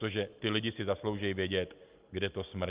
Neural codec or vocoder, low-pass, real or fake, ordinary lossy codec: none; 3.6 kHz; real; Opus, 32 kbps